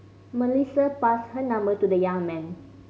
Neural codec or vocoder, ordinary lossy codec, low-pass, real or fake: none; none; none; real